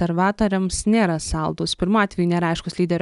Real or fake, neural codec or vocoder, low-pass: real; none; 10.8 kHz